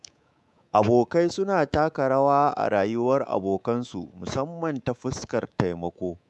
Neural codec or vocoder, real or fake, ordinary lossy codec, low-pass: codec, 24 kHz, 3.1 kbps, DualCodec; fake; none; none